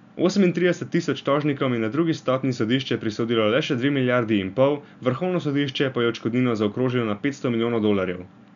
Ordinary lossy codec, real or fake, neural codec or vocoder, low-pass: none; real; none; 7.2 kHz